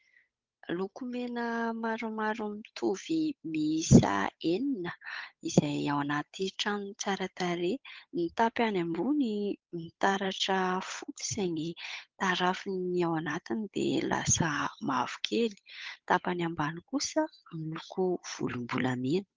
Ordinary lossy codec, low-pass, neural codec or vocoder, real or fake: Opus, 24 kbps; 7.2 kHz; codec, 16 kHz, 8 kbps, FunCodec, trained on Chinese and English, 25 frames a second; fake